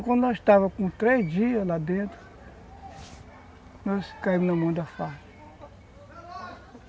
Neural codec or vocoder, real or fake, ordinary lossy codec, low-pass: none; real; none; none